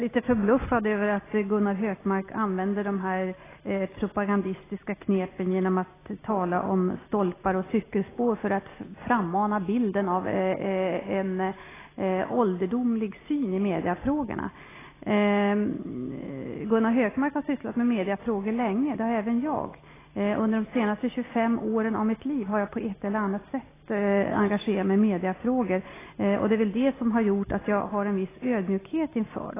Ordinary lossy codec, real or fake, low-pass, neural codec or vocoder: AAC, 16 kbps; real; 3.6 kHz; none